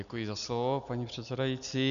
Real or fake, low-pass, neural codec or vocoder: real; 7.2 kHz; none